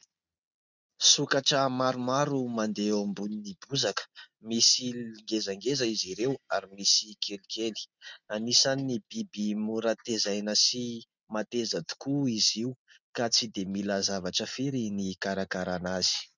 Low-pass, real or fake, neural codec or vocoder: 7.2 kHz; real; none